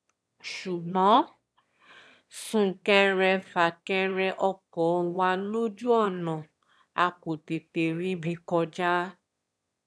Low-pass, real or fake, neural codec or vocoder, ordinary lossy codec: none; fake; autoencoder, 22.05 kHz, a latent of 192 numbers a frame, VITS, trained on one speaker; none